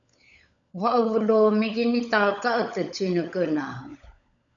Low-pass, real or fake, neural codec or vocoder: 7.2 kHz; fake; codec, 16 kHz, 16 kbps, FunCodec, trained on LibriTTS, 50 frames a second